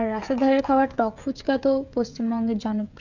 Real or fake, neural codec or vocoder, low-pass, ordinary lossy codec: fake; codec, 16 kHz, 16 kbps, FreqCodec, smaller model; 7.2 kHz; none